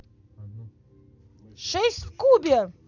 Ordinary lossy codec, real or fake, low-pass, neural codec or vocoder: none; real; 7.2 kHz; none